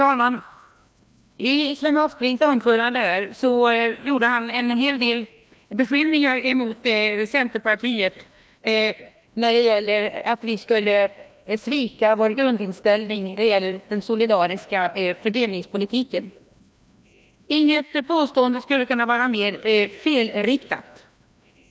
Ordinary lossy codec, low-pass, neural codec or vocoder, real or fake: none; none; codec, 16 kHz, 1 kbps, FreqCodec, larger model; fake